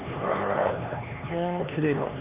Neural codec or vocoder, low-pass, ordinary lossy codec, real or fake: codec, 16 kHz, 2 kbps, X-Codec, HuBERT features, trained on LibriSpeech; 3.6 kHz; Opus, 64 kbps; fake